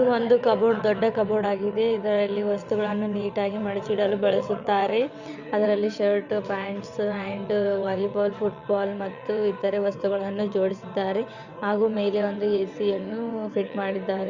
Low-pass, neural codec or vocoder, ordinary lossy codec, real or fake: 7.2 kHz; vocoder, 22.05 kHz, 80 mel bands, WaveNeXt; Opus, 64 kbps; fake